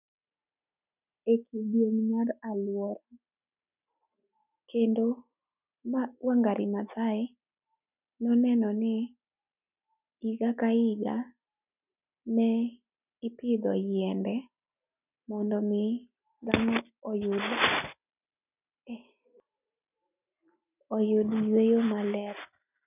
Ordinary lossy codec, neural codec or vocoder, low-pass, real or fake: none; none; 3.6 kHz; real